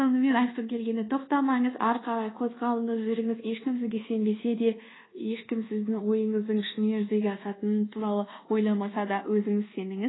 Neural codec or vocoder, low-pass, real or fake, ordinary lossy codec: codec, 24 kHz, 1.2 kbps, DualCodec; 7.2 kHz; fake; AAC, 16 kbps